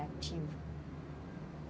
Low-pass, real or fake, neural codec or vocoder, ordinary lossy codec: none; real; none; none